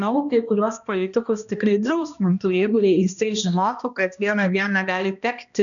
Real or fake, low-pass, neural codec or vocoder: fake; 7.2 kHz; codec, 16 kHz, 1 kbps, X-Codec, HuBERT features, trained on balanced general audio